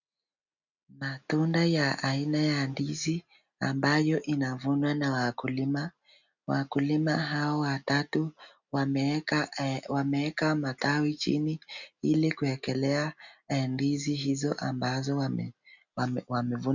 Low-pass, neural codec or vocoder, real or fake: 7.2 kHz; none; real